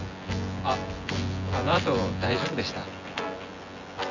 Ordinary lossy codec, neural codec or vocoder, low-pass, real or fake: none; vocoder, 24 kHz, 100 mel bands, Vocos; 7.2 kHz; fake